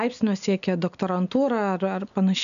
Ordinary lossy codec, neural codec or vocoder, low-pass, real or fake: MP3, 96 kbps; none; 7.2 kHz; real